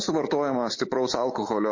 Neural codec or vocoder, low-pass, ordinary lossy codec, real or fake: none; 7.2 kHz; MP3, 32 kbps; real